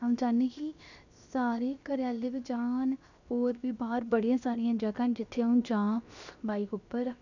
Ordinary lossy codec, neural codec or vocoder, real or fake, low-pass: none; codec, 16 kHz, 0.7 kbps, FocalCodec; fake; 7.2 kHz